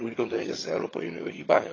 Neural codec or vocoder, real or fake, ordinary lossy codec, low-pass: vocoder, 22.05 kHz, 80 mel bands, HiFi-GAN; fake; AAC, 32 kbps; 7.2 kHz